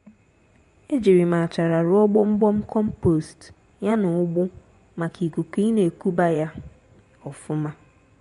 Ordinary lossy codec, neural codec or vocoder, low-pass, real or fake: MP3, 64 kbps; vocoder, 24 kHz, 100 mel bands, Vocos; 10.8 kHz; fake